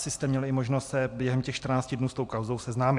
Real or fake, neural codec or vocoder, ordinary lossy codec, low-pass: real; none; AAC, 64 kbps; 10.8 kHz